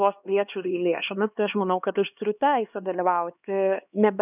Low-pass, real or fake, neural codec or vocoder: 3.6 kHz; fake; codec, 16 kHz, 2 kbps, X-Codec, HuBERT features, trained on LibriSpeech